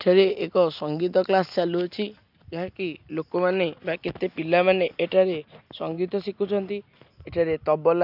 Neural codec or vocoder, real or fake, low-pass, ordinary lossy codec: none; real; 5.4 kHz; none